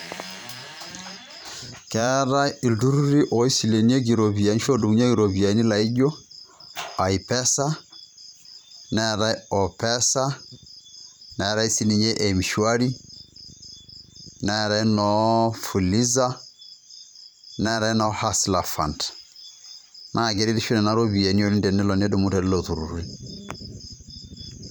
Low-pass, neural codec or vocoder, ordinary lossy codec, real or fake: none; vocoder, 44.1 kHz, 128 mel bands every 512 samples, BigVGAN v2; none; fake